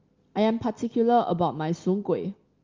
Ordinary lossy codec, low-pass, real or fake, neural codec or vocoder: Opus, 32 kbps; 7.2 kHz; real; none